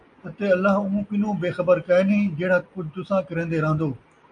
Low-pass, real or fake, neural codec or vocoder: 9.9 kHz; real; none